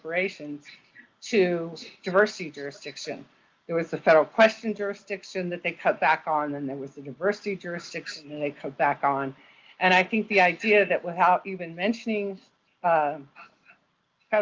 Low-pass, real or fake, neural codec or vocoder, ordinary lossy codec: 7.2 kHz; real; none; Opus, 32 kbps